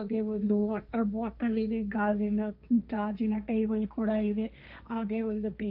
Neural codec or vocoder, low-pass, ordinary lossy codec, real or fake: codec, 16 kHz, 1.1 kbps, Voila-Tokenizer; 5.4 kHz; none; fake